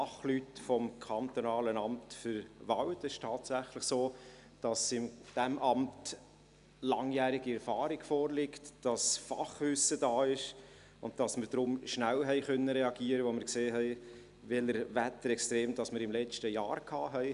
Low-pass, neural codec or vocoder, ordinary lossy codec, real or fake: 10.8 kHz; none; none; real